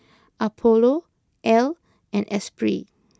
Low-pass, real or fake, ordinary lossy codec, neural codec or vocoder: none; real; none; none